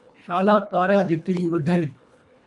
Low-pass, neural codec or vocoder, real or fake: 10.8 kHz; codec, 24 kHz, 1.5 kbps, HILCodec; fake